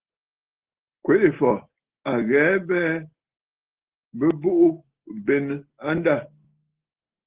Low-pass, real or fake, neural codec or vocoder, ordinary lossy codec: 3.6 kHz; real; none; Opus, 16 kbps